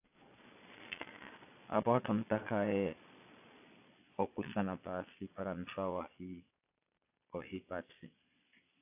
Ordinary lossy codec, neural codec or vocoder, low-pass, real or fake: AAC, 24 kbps; vocoder, 22.05 kHz, 80 mel bands, Vocos; 3.6 kHz; fake